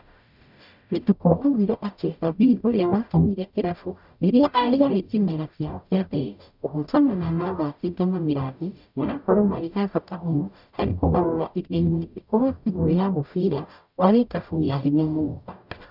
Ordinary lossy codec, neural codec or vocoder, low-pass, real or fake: none; codec, 44.1 kHz, 0.9 kbps, DAC; 5.4 kHz; fake